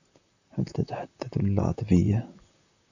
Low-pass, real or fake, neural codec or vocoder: 7.2 kHz; fake; vocoder, 44.1 kHz, 128 mel bands, Pupu-Vocoder